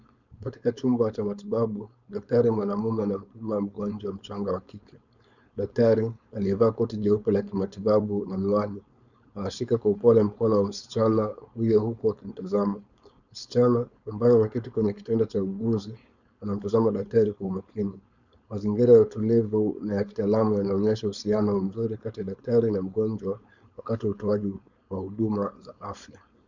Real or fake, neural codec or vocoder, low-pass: fake; codec, 16 kHz, 4.8 kbps, FACodec; 7.2 kHz